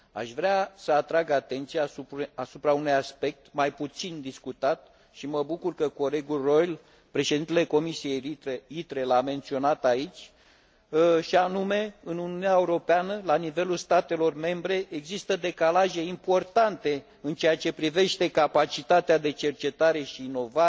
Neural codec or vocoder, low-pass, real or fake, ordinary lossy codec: none; none; real; none